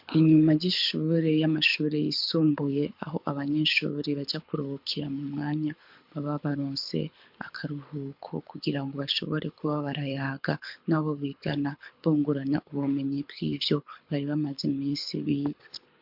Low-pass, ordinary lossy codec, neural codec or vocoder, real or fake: 5.4 kHz; MP3, 48 kbps; codec, 24 kHz, 6 kbps, HILCodec; fake